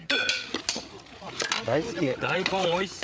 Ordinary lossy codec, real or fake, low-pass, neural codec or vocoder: none; fake; none; codec, 16 kHz, 8 kbps, FreqCodec, larger model